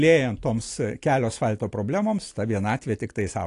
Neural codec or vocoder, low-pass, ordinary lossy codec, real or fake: none; 10.8 kHz; AAC, 48 kbps; real